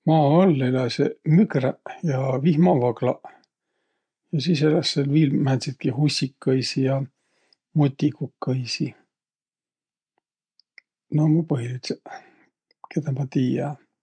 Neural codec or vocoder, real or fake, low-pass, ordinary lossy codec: none; real; 9.9 kHz; MP3, 64 kbps